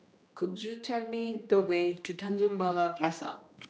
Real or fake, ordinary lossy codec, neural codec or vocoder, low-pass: fake; none; codec, 16 kHz, 1 kbps, X-Codec, HuBERT features, trained on general audio; none